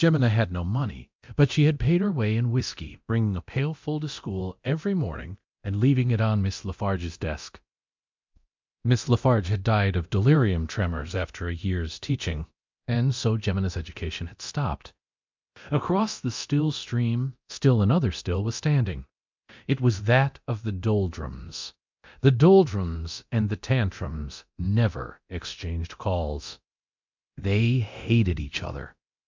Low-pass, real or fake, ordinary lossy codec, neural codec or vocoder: 7.2 kHz; fake; MP3, 64 kbps; codec, 24 kHz, 0.9 kbps, DualCodec